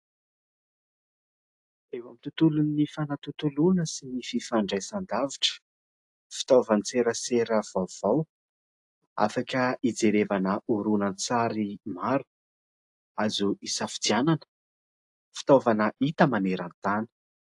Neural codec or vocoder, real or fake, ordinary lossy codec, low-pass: none; real; AAC, 64 kbps; 10.8 kHz